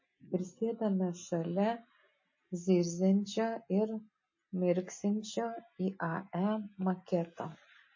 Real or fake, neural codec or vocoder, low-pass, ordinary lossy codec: real; none; 7.2 kHz; MP3, 32 kbps